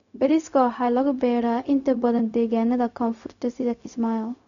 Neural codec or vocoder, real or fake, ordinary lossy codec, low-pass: codec, 16 kHz, 0.4 kbps, LongCat-Audio-Codec; fake; none; 7.2 kHz